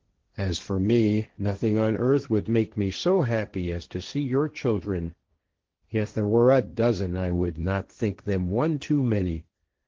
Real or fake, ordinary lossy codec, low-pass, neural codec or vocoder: fake; Opus, 16 kbps; 7.2 kHz; codec, 16 kHz, 1.1 kbps, Voila-Tokenizer